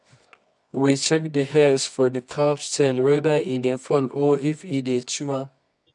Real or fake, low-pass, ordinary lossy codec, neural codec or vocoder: fake; 10.8 kHz; none; codec, 24 kHz, 0.9 kbps, WavTokenizer, medium music audio release